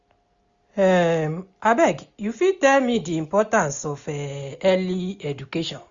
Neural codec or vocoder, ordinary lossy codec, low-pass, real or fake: none; Opus, 64 kbps; 7.2 kHz; real